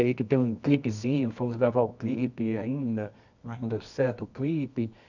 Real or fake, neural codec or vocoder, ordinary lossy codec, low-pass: fake; codec, 24 kHz, 0.9 kbps, WavTokenizer, medium music audio release; none; 7.2 kHz